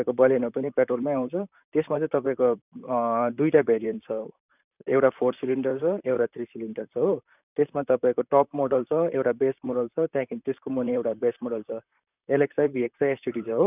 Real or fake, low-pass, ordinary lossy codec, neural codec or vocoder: fake; 3.6 kHz; none; vocoder, 44.1 kHz, 128 mel bands, Pupu-Vocoder